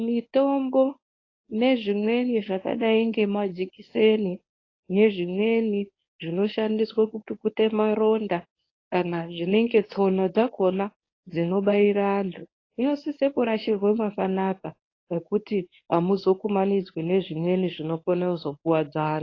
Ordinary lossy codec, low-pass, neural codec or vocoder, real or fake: AAC, 32 kbps; 7.2 kHz; codec, 24 kHz, 0.9 kbps, WavTokenizer, medium speech release version 1; fake